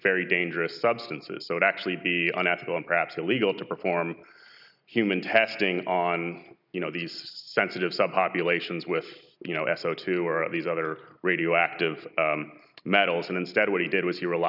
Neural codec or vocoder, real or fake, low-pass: none; real; 5.4 kHz